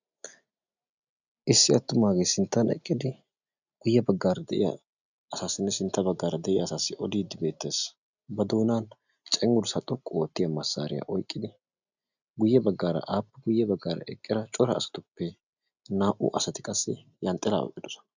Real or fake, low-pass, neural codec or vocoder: real; 7.2 kHz; none